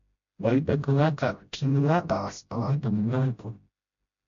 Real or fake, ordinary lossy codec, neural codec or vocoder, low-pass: fake; MP3, 48 kbps; codec, 16 kHz, 0.5 kbps, FreqCodec, smaller model; 7.2 kHz